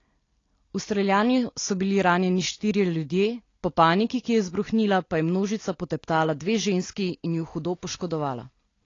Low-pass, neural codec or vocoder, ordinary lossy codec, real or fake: 7.2 kHz; none; AAC, 32 kbps; real